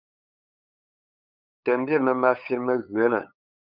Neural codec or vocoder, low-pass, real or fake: codec, 16 kHz, 8 kbps, FunCodec, trained on LibriTTS, 25 frames a second; 5.4 kHz; fake